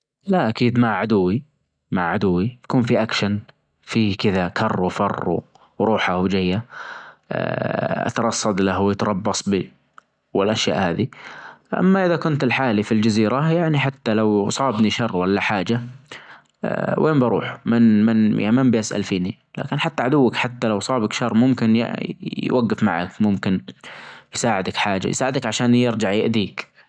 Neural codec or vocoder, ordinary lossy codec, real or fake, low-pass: none; none; real; 9.9 kHz